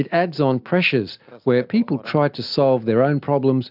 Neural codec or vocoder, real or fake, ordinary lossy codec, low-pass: none; real; AAC, 48 kbps; 5.4 kHz